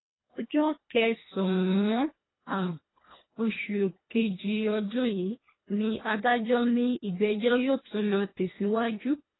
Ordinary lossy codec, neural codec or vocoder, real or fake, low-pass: AAC, 16 kbps; codec, 24 kHz, 1.5 kbps, HILCodec; fake; 7.2 kHz